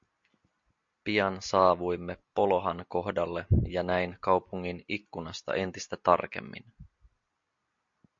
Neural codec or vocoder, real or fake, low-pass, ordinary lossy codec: none; real; 7.2 kHz; MP3, 48 kbps